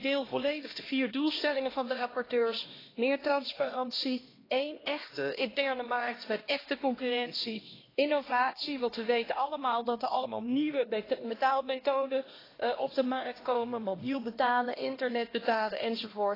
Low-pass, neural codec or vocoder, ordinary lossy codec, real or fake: 5.4 kHz; codec, 16 kHz, 1 kbps, X-Codec, HuBERT features, trained on LibriSpeech; AAC, 24 kbps; fake